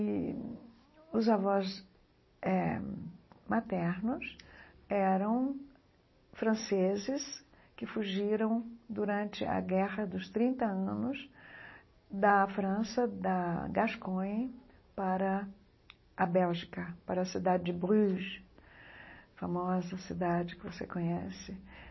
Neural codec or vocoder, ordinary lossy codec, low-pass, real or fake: none; MP3, 24 kbps; 7.2 kHz; real